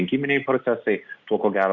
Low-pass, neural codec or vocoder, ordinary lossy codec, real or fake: 7.2 kHz; none; Opus, 64 kbps; real